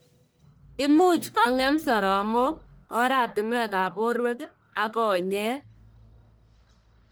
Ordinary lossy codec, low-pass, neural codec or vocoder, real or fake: none; none; codec, 44.1 kHz, 1.7 kbps, Pupu-Codec; fake